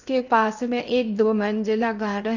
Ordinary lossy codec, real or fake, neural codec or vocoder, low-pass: none; fake; codec, 16 kHz in and 24 kHz out, 0.6 kbps, FocalCodec, streaming, 2048 codes; 7.2 kHz